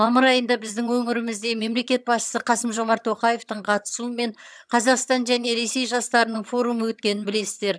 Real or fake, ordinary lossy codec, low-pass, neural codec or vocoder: fake; none; none; vocoder, 22.05 kHz, 80 mel bands, HiFi-GAN